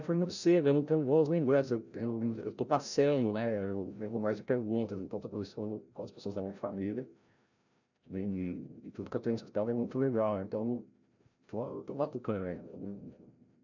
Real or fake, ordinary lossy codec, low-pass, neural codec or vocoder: fake; none; 7.2 kHz; codec, 16 kHz, 0.5 kbps, FreqCodec, larger model